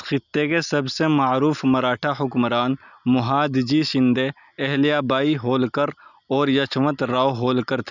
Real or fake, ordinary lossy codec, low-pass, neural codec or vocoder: real; none; 7.2 kHz; none